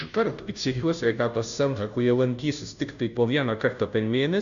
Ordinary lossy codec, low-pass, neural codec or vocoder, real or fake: AAC, 96 kbps; 7.2 kHz; codec, 16 kHz, 0.5 kbps, FunCodec, trained on Chinese and English, 25 frames a second; fake